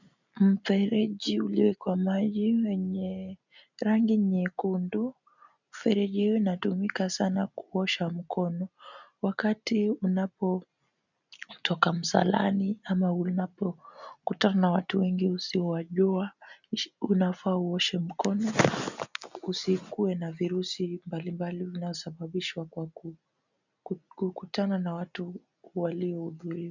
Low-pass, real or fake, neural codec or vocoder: 7.2 kHz; real; none